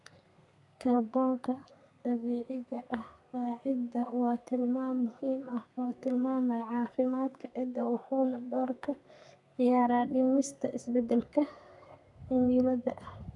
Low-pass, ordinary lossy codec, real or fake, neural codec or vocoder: 10.8 kHz; none; fake; codec, 32 kHz, 1.9 kbps, SNAC